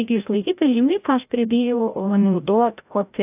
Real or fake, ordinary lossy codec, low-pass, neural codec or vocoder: fake; AAC, 32 kbps; 3.6 kHz; codec, 16 kHz, 0.5 kbps, FreqCodec, larger model